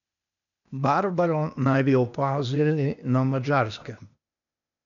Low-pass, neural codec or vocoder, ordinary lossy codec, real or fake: 7.2 kHz; codec, 16 kHz, 0.8 kbps, ZipCodec; none; fake